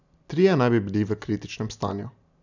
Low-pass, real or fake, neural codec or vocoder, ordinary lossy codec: 7.2 kHz; real; none; none